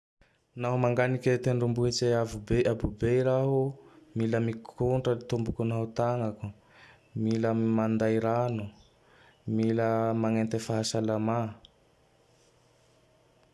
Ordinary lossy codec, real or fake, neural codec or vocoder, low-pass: none; real; none; none